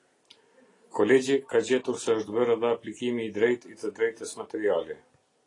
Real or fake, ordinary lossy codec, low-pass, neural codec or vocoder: real; AAC, 32 kbps; 10.8 kHz; none